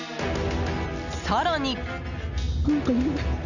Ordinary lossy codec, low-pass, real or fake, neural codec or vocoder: none; 7.2 kHz; real; none